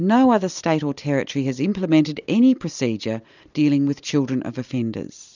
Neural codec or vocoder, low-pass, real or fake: none; 7.2 kHz; real